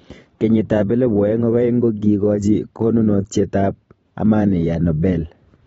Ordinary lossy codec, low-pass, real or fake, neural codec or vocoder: AAC, 24 kbps; 19.8 kHz; fake; vocoder, 44.1 kHz, 128 mel bands every 512 samples, BigVGAN v2